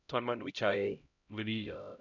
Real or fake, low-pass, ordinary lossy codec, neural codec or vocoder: fake; 7.2 kHz; none; codec, 16 kHz, 0.5 kbps, X-Codec, HuBERT features, trained on LibriSpeech